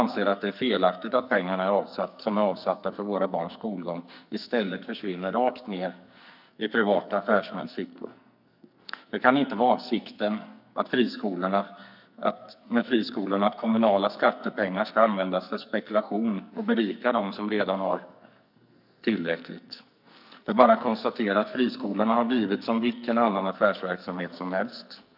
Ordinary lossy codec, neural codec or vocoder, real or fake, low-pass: none; codec, 44.1 kHz, 2.6 kbps, SNAC; fake; 5.4 kHz